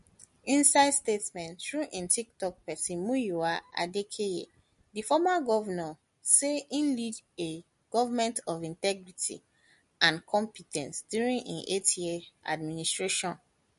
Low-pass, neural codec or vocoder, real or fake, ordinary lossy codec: 14.4 kHz; none; real; MP3, 48 kbps